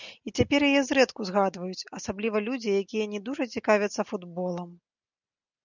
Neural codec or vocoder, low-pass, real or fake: none; 7.2 kHz; real